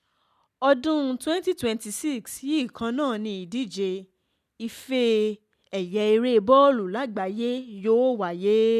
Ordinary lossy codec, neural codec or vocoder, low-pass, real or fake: none; none; 14.4 kHz; real